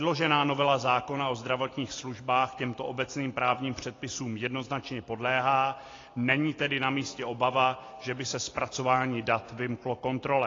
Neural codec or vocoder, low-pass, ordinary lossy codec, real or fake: none; 7.2 kHz; AAC, 32 kbps; real